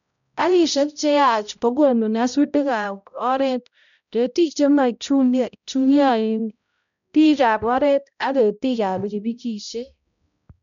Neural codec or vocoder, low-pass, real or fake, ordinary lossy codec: codec, 16 kHz, 0.5 kbps, X-Codec, HuBERT features, trained on balanced general audio; 7.2 kHz; fake; none